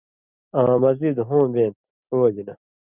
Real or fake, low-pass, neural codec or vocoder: real; 3.6 kHz; none